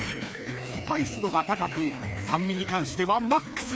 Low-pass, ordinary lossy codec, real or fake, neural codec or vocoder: none; none; fake; codec, 16 kHz, 2 kbps, FreqCodec, larger model